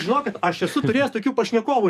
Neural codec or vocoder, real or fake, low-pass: codec, 44.1 kHz, 7.8 kbps, DAC; fake; 14.4 kHz